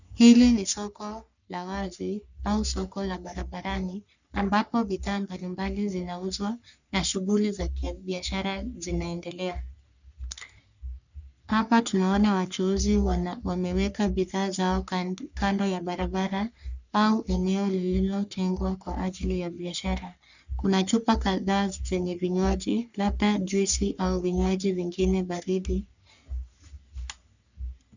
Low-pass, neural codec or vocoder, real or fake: 7.2 kHz; codec, 44.1 kHz, 3.4 kbps, Pupu-Codec; fake